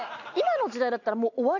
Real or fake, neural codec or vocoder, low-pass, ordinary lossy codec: real; none; 7.2 kHz; none